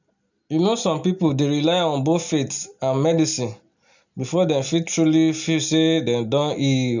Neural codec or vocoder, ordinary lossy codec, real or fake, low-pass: none; none; real; 7.2 kHz